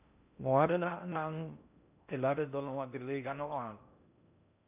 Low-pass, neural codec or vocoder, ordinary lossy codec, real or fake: 3.6 kHz; codec, 16 kHz in and 24 kHz out, 0.6 kbps, FocalCodec, streaming, 4096 codes; none; fake